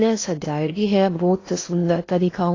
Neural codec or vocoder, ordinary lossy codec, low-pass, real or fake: codec, 16 kHz in and 24 kHz out, 0.8 kbps, FocalCodec, streaming, 65536 codes; AAC, 32 kbps; 7.2 kHz; fake